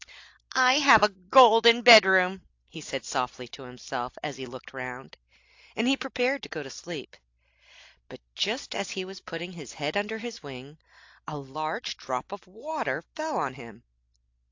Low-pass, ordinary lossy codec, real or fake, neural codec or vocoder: 7.2 kHz; AAC, 48 kbps; real; none